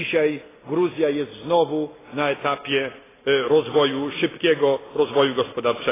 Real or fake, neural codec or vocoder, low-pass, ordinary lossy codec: real; none; 3.6 kHz; AAC, 16 kbps